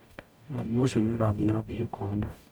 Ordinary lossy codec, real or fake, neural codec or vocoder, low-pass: none; fake; codec, 44.1 kHz, 0.9 kbps, DAC; none